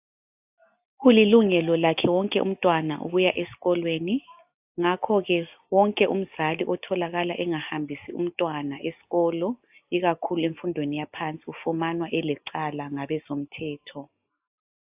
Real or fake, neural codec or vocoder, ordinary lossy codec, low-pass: real; none; AAC, 32 kbps; 3.6 kHz